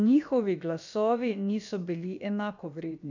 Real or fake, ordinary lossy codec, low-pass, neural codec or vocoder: fake; none; 7.2 kHz; codec, 16 kHz, about 1 kbps, DyCAST, with the encoder's durations